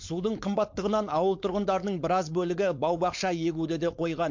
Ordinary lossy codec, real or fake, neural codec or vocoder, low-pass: MP3, 48 kbps; fake; codec, 16 kHz, 4.8 kbps, FACodec; 7.2 kHz